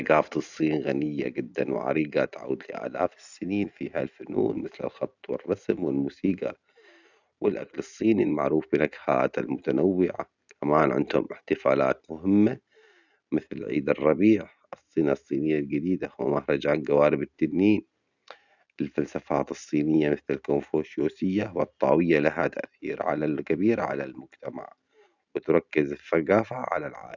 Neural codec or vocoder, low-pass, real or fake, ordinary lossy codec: none; 7.2 kHz; real; none